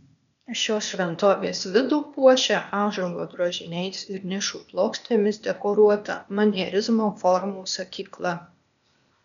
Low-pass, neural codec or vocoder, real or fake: 7.2 kHz; codec, 16 kHz, 0.8 kbps, ZipCodec; fake